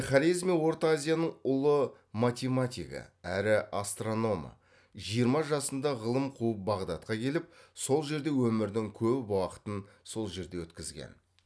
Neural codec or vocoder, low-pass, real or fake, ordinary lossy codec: none; none; real; none